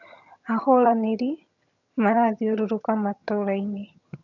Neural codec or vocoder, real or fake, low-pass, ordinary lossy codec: vocoder, 22.05 kHz, 80 mel bands, HiFi-GAN; fake; 7.2 kHz; none